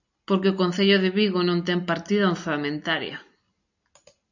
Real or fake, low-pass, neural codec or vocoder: real; 7.2 kHz; none